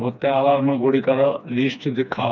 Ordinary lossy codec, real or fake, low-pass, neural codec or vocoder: none; fake; 7.2 kHz; codec, 16 kHz, 2 kbps, FreqCodec, smaller model